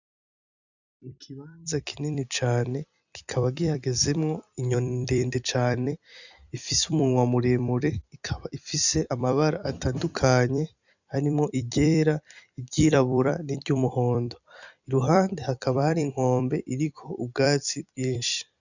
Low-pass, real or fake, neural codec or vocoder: 7.2 kHz; fake; vocoder, 44.1 kHz, 128 mel bands every 256 samples, BigVGAN v2